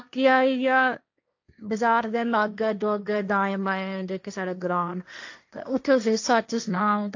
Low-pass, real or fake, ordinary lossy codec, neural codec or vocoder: 7.2 kHz; fake; AAC, 48 kbps; codec, 16 kHz, 1.1 kbps, Voila-Tokenizer